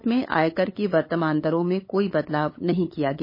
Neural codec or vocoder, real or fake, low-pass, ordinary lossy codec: codec, 16 kHz, 8 kbps, FunCodec, trained on LibriTTS, 25 frames a second; fake; 5.4 kHz; MP3, 24 kbps